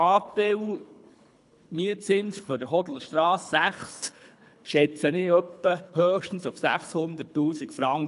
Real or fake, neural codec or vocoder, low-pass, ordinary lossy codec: fake; codec, 24 kHz, 3 kbps, HILCodec; 10.8 kHz; AAC, 96 kbps